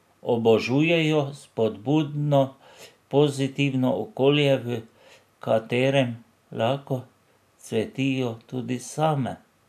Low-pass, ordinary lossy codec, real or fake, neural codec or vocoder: 14.4 kHz; none; real; none